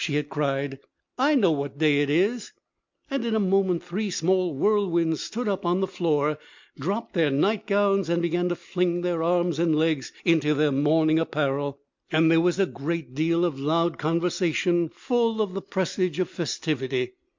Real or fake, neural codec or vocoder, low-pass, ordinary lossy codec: real; none; 7.2 kHz; MP3, 64 kbps